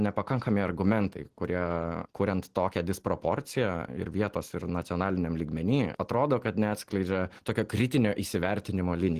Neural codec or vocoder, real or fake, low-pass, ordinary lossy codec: none; real; 14.4 kHz; Opus, 16 kbps